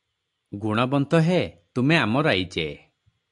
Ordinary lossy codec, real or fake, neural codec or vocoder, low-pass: AAC, 64 kbps; real; none; 10.8 kHz